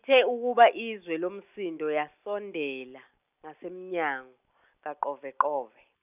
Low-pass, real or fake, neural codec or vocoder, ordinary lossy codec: 3.6 kHz; real; none; none